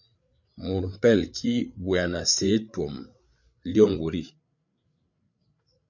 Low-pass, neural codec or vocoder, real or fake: 7.2 kHz; codec, 16 kHz, 8 kbps, FreqCodec, larger model; fake